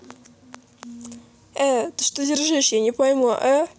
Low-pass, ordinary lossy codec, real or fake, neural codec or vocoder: none; none; real; none